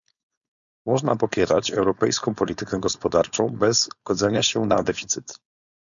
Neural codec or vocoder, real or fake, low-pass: codec, 16 kHz, 4.8 kbps, FACodec; fake; 7.2 kHz